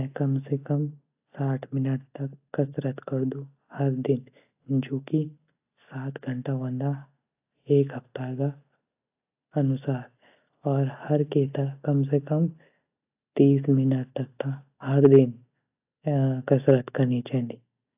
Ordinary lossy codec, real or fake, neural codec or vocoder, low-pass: AAC, 32 kbps; real; none; 3.6 kHz